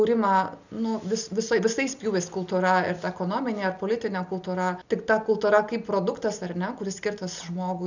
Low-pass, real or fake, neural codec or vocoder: 7.2 kHz; real; none